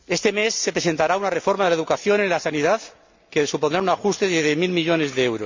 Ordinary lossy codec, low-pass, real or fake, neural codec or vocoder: MP3, 64 kbps; 7.2 kHz; real; none